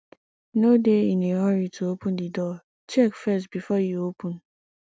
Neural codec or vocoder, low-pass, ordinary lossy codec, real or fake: none; none; none; real